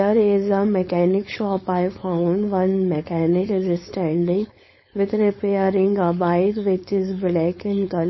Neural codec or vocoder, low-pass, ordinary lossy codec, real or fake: codec, 16 kHz, 4.8 kbps, FACodec; 7.2 kHz; MP3, 24 kbps; fake